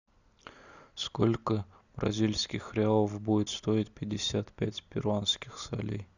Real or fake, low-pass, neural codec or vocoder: real; 7.2 kHz; none